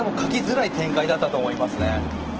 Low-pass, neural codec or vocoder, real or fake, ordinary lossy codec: 7.2 kHz; none; real; Opus, 16 kbps